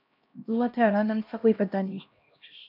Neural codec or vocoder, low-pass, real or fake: codec, 16 kHz, 1 kbps, X-Codec, HuBERT features, trained on LibriSpeech; 5.4 kHz; fake